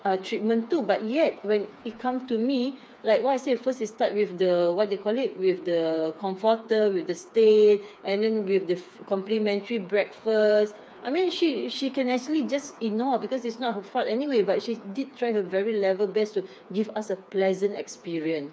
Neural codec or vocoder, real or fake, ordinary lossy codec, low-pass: codec, 16 kHz, 4 kbps, FreqCodec, smaller model; fake; none; none